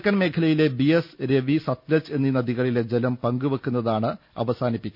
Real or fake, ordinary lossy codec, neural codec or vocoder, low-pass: real; none; none; 5.4 kHz